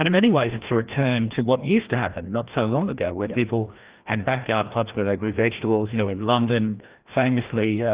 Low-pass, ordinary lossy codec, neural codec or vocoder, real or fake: 3.6 kHz; Opus, 32 kbps; codec, 16 kHz, 1 kbps, FreqCodec, larger model; fake